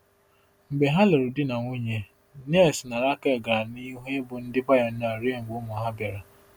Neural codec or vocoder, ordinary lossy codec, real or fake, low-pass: none; none; real; none